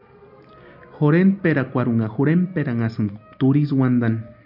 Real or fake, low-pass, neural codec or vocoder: real; 5.4 kHz; none